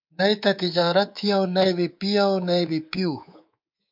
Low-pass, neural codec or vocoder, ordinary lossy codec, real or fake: 5.4 kHz; codec, 24 kHz, 3.1 kbps, DualCodec; MP3, 48 kbps; fake